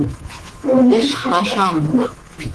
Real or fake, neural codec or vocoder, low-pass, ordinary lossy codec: fake; codec, 24 kHz, 3.1 kbps, DualCodec; 10.8 kHz; Opus, 16 kbps